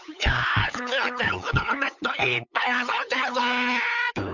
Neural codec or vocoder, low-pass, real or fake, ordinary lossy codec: codec, 16 kHz, 8 kbps, FunCodec, trained on LibriTTS, 25 frames a second; 7.2 kHz; fake; none